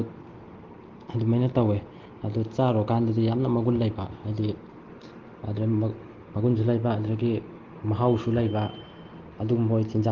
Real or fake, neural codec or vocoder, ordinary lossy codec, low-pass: real; none; Opus, 16 kbps; 7.2 kHz